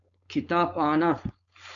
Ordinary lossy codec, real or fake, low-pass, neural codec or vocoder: MP3, 96 kbps; fake; 7.2 kHz; codec, 16 kHz, 4.8 kbps, FACodec